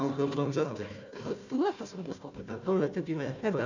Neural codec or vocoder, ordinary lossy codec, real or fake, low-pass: codec, 16 kHz, 1 kbps, FunCodec, trained on Chinese and English, 50 frames a second; none; fake; 7.2 kHz